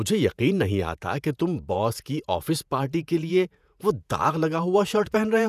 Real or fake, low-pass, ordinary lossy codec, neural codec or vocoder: fake; 14.4 kHz; none; vocoder, 48 kHz, 128 mel bands, Vocos